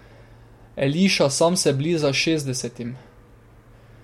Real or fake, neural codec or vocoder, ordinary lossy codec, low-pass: real; none; MP3, 64 kbps; 19.8 kHz